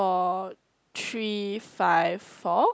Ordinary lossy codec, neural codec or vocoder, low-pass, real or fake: none; none; none; real